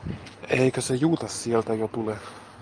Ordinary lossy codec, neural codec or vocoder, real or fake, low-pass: Opus, 32 kbps; none; real; 9.9 kHz